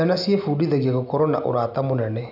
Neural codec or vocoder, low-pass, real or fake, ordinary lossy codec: none; 5.4 kHz; real; none